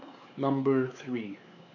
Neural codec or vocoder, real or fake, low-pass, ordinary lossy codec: codec, 16 kHz, 4 kbps, X-Codec, WavLM features, trained on Multilingual LibriSpeech; fake; 7.2 kHz; none